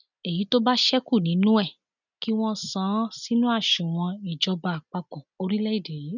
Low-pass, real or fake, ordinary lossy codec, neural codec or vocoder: 7.2 kHz; real; none; none